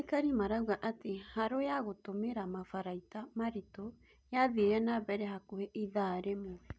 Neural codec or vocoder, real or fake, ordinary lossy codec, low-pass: none; real; none; none